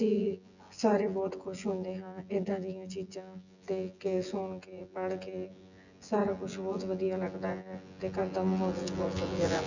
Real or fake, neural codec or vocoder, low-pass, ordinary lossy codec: fake; vocoder, 24 kHz, 100 mel bands, Vocos; 7.2 kHz; none